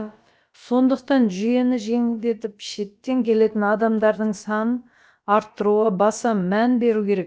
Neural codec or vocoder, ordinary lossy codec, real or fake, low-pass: codec, 16 kHz, about 1 kbps, DyCAST, with the encoder's durations; none; fake; none